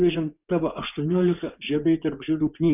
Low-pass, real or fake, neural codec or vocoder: 3.6 kHz; fake; vocoder, 24 kHz, 100 mel bands, Vocos